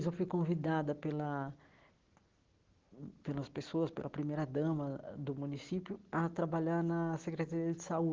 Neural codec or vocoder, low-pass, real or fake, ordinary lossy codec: none; 7.2 kHz; real; Opus, 16 kbps